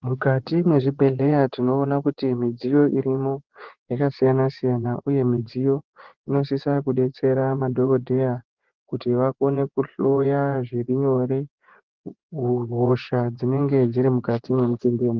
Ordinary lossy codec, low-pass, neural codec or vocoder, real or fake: Opus, 16 kbps; 7.2 kHz; vocoder, 24 kHz, 100 mel bands, Vocos; fake